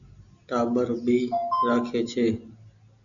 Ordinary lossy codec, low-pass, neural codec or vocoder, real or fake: MP3, 96 kbps; 7.2 kHz; none; real